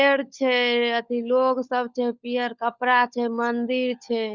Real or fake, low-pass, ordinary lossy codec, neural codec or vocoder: fake; 7.2 kHz; Opus, 64 kbps; codec, 16 kHz, 16 kbps, FunCodec, trained on LibriTTS, 50 frames a second